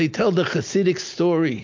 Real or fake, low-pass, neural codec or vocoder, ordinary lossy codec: real; 7.2 kHz; none; MP3, 48 kbps